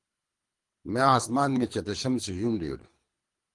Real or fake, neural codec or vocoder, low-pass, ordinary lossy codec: fake; codec, 24 kHz, 3 kbps, HILCodec; 10.8 kHz; Opus, 24 kbps